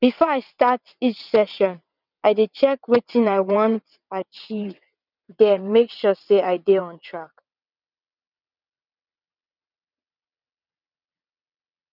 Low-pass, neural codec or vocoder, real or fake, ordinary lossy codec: 5.4 kHz; vocoder, 22.05 kHz, 80 mel bands, WaveNeXt; fake; none